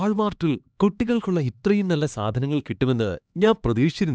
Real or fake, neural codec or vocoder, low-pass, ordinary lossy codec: fake; codec, 16 kHz, 2 kbps, X-Codec, HuBERT features, trained on LibriSpeech; none; none